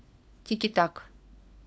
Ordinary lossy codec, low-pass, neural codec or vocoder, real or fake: none; none; codec, 16 kHz, 4 kbps, FunCodec, trained on LibriTTS, 50 frames a second; fake